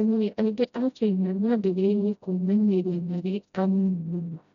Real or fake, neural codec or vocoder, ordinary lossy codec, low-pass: fake; codec, 16 kHz, 0.5 kbps, FreqCodec, smaller model; none; 7.2 kHz